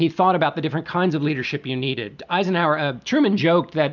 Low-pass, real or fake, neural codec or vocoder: 7.2 kHz; real; none